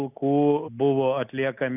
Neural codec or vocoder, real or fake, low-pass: none; real; 3.6 kHz